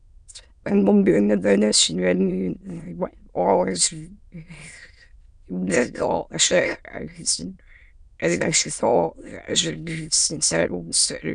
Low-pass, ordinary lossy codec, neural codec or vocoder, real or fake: 9.9 kHz; none; autoencoder, 22.05 kHz, a latent of 192 numbers a frame, VITS, trained on many speakers; fake